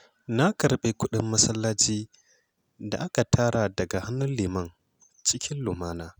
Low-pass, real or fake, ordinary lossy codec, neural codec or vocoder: none; real; none; none